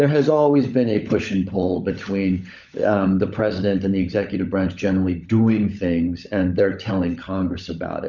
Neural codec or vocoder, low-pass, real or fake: codec, 16 kHz, 16 kbps, FunCodec, trained on LibriTTS, 50 frames a second; 7.2 kHz; fake